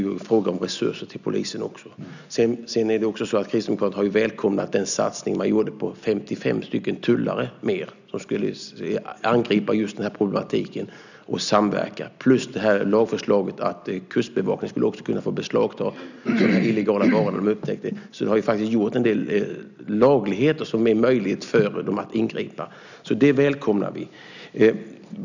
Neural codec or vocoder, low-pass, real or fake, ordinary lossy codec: none; 7.2 kHz; real; none